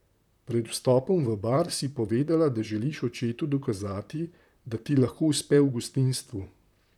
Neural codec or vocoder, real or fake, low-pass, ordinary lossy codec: vocoder, 44.1 kHz, 128 mel bands, Pupu-Vocoder; fake; 19.8 kHz; none